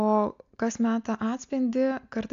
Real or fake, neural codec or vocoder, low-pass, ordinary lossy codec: real; none; 7.2 kHz; AAC, 64 kbps